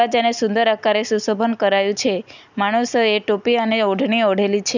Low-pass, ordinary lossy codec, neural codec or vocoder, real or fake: 7.2 kHz; none; none; real